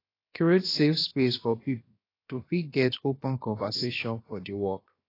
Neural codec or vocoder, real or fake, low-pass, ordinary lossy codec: codec, 16 kHz, about 1 kbps, DyCAST, with the encoder's durations; fake; 5.4 kHz; AAC, 24 kbps